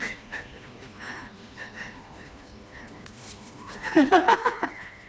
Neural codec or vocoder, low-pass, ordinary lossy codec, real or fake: codec, 16 kHz, 2 kbps, FreqCodec, smaller model; none; none; fake